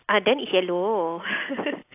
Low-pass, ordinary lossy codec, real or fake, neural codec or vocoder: 3.6 kHz; AAC, 32 kbps; real; none